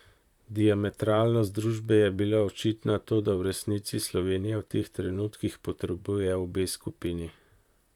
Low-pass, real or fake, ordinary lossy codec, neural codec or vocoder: 19.8 kHz; fake; none; vocoder, 44.1 kHz, 128 mel bands, Pupu-Vocoder